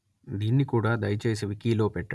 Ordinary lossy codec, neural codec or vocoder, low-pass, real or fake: none; none; none; real